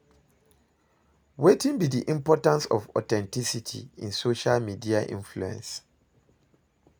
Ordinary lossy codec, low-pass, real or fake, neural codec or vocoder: none; none; real; none